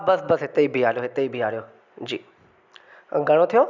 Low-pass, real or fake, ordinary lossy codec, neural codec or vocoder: 7.2 kHz; real; none; none